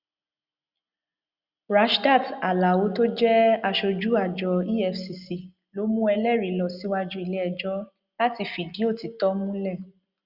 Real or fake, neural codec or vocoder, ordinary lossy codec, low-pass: real; none; Opus, 64 kbps; 5.4 kHz